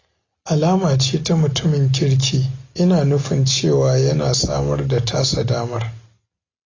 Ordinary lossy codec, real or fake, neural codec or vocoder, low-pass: AAC, 32 kbps; real; none; 7.2 kHz